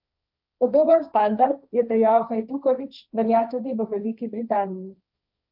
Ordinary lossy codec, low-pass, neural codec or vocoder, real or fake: none; 5.4 kHz; codec, 16 kHz, 1.1 kbps, Voila-Tokenizer; fake